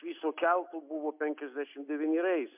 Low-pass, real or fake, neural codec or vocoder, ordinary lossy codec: 3.6 kHz; real; none; MP3, 32 kbps